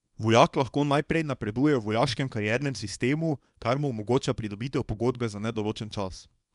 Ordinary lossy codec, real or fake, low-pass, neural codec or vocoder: none; fake; 10.8 kHz; codec, 24 kHz, 0.9 kbps, WavTokenizer, small release